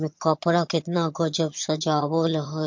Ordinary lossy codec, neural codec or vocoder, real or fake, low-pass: MP3, 48 kbps; vocoder, 22.05 kHz, 80 mel bands, HiFi-GAN; fake; 7.2 kHz